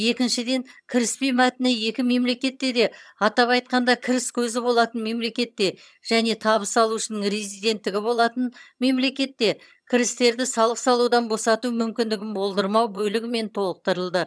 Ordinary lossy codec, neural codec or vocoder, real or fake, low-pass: none; vocoder, 22.05 kHz, 80 mel bands, HiFi-GAN; fake; none